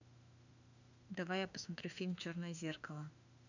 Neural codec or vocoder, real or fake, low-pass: autoencoder, 48 kHz, 32 numbers a frame, DAC-VAE, trained on Japanese speech; fake; 7.2 kHz